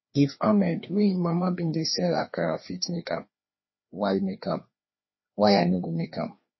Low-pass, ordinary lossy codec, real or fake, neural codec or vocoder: 7.2 kHz; MP3, 24 kbps; fake; codec, 16 kHz, 2 kbps, FreqCodec, larger model